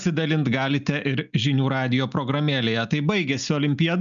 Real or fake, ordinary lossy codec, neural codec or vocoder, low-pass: real; MP3, 96 kbps; none; 7.2 kHz